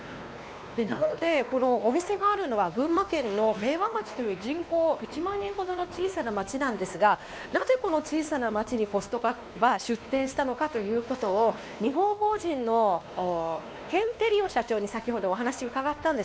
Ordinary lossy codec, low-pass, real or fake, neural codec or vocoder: none; none; fake; codec, 16 kHz, 2 kbps, X-Codec, WavLM features, trained on Multilingual LibriSpeech